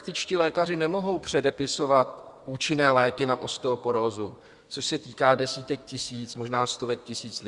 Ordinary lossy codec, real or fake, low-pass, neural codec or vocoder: Opus, 64 kbps; fake; 10.8 kHz; codec, 32 kHz, 1.9 kbps, SNAC